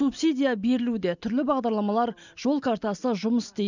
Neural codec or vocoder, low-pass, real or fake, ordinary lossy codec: none; 7.2 kHz; real; none